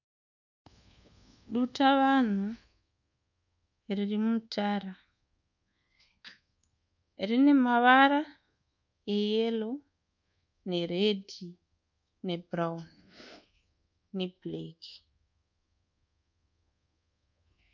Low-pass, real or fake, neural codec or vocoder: 7.2 kHz; fake; codec, 24 kHz, 1.2 kbps, DualCodec